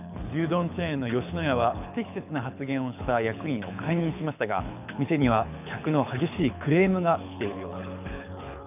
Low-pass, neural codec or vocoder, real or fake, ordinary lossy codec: 3.6 kHz; codec, 24 kHz, 6 kbps, HILCodec; fake; none